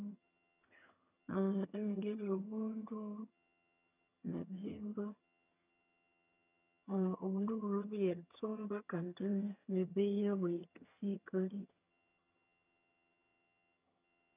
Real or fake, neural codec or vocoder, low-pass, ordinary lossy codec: fake; vocoder, 22.05 kHz, 80 mel bands, HiFi-GAN; 3.6 kHz; none